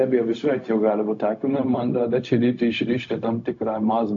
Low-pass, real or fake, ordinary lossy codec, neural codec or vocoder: 7.2 kHz; fake; AAC, 48 kbps; codec, 16 kHz, 0.4 kbps, LongCat-Audio-Codec